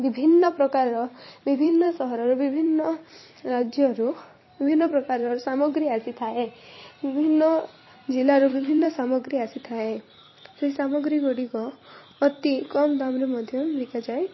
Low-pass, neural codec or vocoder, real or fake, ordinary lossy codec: 7.2 kHz; vocoder, 44.1 kHz, 128 mel bands every 256 samples, BigVGAN v2; fake; MP3, 24 kbps